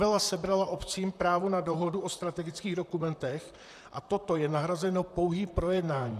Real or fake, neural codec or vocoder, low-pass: fake; vocoder, 44.1 kHz, 128 mel bands, Pupu-Vocoder; 14.4 kHz